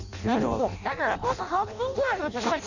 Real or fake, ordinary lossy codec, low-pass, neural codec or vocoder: fake; none; 7.2 kHz; codec, 16 kHz in and 24 kHz out, 0.6 kbps, FireRedTTS-2 codec